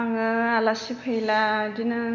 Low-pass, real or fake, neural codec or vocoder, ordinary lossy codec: 7.2 kHz; real; none; none